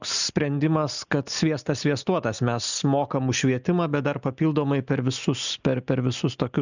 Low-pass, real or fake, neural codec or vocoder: 7.2 kHz; real; none